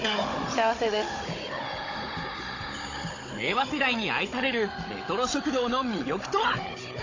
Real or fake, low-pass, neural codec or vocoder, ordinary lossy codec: fake; 7.2 kHz; codec, 16 kHz, 16 kbps, FunCodec, trained on Chinese and English, 50 frames a second; AAC, 32 kbps